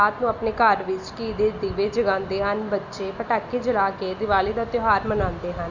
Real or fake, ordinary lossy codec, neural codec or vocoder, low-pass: real; Opus, 64 kbps; none; 7.2 kHz